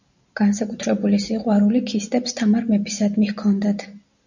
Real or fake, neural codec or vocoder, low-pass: real; none; 7.2 kHz